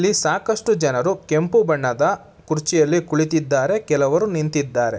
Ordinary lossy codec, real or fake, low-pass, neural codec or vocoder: none; real; none; none